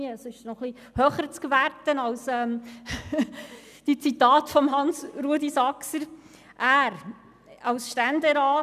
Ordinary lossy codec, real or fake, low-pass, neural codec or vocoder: none; real; 14.4 kHz; none